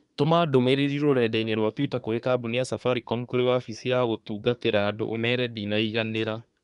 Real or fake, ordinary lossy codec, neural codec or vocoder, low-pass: fake; none; codec, 24 kHz, 1 kbps, SNAC; 10.8 kHz